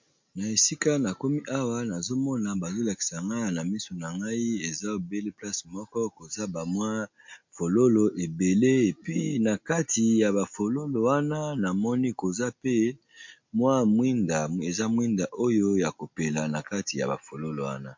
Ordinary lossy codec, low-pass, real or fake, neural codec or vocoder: MP3, 48 kbps; 7.2 kHz; real; none